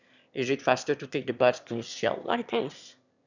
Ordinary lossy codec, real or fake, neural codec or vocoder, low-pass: none; fake; autoencoder, 22.05 kHz, a latent of 192 numbers a frame, VITS, trained on one speaker; 7.2 kHz